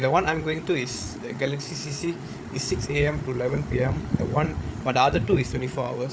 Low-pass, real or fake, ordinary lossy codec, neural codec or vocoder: none; fake; none; codec, 16 kHz, 8 kbps, FreqCodec, larger model